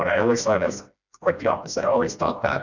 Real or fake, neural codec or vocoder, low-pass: fake; codec, 16 kHz, 1 kbps, FreqCodec, smaller model; 7.2 kHz